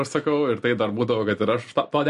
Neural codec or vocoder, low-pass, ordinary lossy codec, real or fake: none; 14.4 kHz; MP3, 48 kbps; real